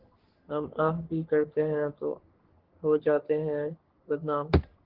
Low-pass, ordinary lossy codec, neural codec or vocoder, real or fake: 5.4 kHz; Opus, 16 kbps; codec, 44.1 kHz, 3.4 kbps, Pupu-Codec; fake